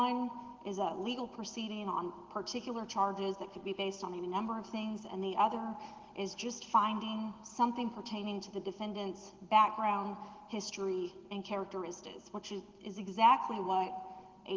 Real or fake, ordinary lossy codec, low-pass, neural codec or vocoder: real; Opus, 16 kbps; 7.2 kHz; none